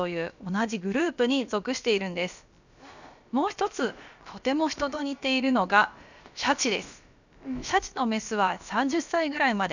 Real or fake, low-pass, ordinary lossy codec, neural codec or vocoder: fake; 7.2 kHz; none; codec, 16 kHz, about 1 kbps, DyCAST, with the encoder's durations